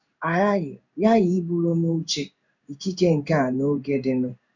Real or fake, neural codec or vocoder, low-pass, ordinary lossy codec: fake; codec, 16 kHz in and 24 kHz out, 1 kbps, XY-Tokenizer; 7.2 kHz; none